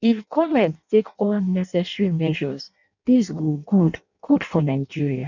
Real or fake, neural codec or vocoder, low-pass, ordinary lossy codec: fake; codec, 16 kHz in and 24 kHz out, 0.6 kbps, FireRedTTS-2 codec; 7.2 kHz; none